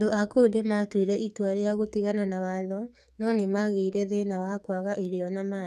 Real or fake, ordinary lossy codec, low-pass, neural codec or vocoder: fake; none; 14.4 kHz; codec, 44.1 kHz, 2.6 kbps, SNAC